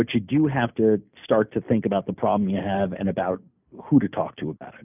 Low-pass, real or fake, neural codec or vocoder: 3.6 kHz; real; none